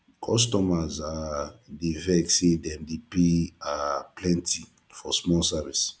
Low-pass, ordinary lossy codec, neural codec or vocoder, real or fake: none; none; none; real